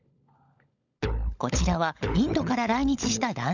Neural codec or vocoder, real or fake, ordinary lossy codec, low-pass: codec, 16 kHz, 16 kbps, FunCodec, trained on LibriTTS, 50 frames a second; fake; none; 7.2 kHz